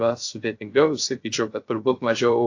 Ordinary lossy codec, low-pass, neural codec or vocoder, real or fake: AAC, 48 kbps; 7.2 kHz; codec, 16 kHz, 0.3 kbps, FocalCodec; fake